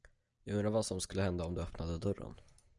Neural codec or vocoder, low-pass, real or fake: none; 10.8 kHz; real